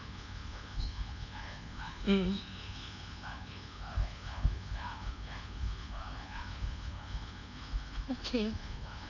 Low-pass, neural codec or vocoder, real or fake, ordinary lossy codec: 7.2 kHz; codec, 24 kHz, 1.2 kbps, DualCodec; fake; none